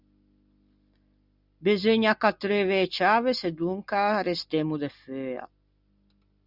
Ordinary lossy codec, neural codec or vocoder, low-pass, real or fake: Opus, 64 kbps; none; 5.4 kHz; real